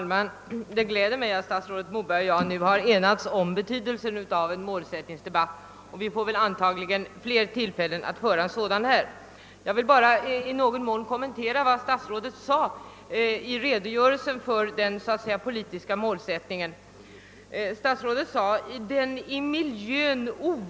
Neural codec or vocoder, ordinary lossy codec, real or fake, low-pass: none; none; real; none